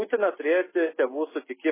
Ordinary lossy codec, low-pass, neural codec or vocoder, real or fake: MP3, 16 kbps; 3.6 kHz; none; real